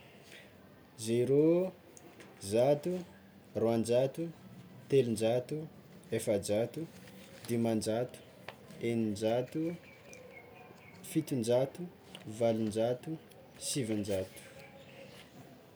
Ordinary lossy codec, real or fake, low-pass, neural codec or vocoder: none; real; none; none